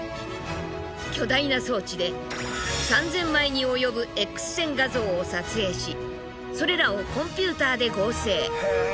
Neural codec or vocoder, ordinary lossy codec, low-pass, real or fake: none; none; none; real